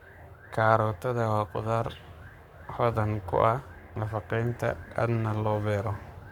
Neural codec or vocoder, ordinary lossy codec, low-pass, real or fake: codec, 44.1 kHz, 7.8 kbps, Pupu-Codec; none; 19.8 kHz; fake